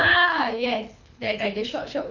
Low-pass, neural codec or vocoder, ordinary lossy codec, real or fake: 7.2 kHz; codec, 24 kHz, 3 kbps, HILCodec; none; fake